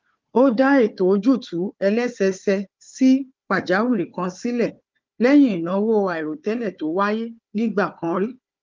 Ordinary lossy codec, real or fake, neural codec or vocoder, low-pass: Opus, 32 kbps; fake; codec, 16 kHz, 4 kbps, FunCodec, trained on Chinese and English, 50 frames a second; 7.2 kHz